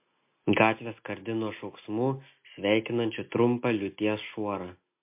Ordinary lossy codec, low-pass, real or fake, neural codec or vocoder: MP3, 24 kbps; 3.6 kHz; real; none